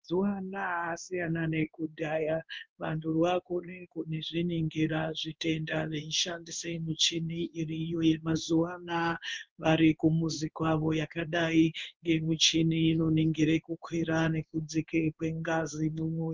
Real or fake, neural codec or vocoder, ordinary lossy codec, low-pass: fake; codec, 16 kHz in and 24 kHz out, 1 kbps, XY-Tokenizer; Opus, 32 kbps; 7.2 kHz